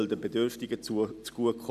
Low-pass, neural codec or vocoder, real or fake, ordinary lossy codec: 14.4 kHz; none; real; none